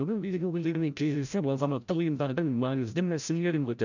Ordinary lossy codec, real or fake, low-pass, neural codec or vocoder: none; fake; 7.2 kHz; codec, 16 kHz, 0.5 kbps, FreqCodec, larger model